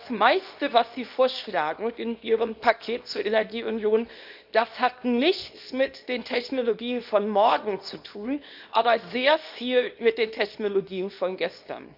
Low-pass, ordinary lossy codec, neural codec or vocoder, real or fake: 5.4 kHz; none; codec, 24 kHz, 0.9 kbps, WavTokenizer, small release; fake